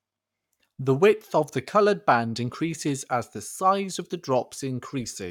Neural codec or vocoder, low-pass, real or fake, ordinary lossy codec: codec, 44.1 kHz, 7.8 kbps, Pupu-Codec; 19.8 kHz; fake; none